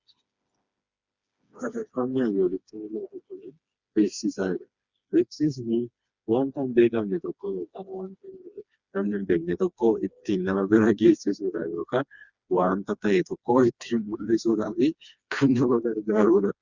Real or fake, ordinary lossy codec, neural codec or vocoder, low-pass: fake; Opus, 64 kbps; codec, 16 kHz, 2 kbps, FreqCodec, smaller model; 7.2 kHz